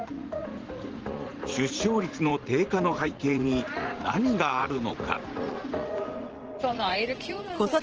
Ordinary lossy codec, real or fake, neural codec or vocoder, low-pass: Opus, 16 kbps; fake; vocoder, 44.1 kHz, 80 mel bands, Vocos; 7.2 kHz